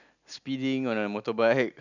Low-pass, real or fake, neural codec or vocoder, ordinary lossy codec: 7.2 kHz; real; none; none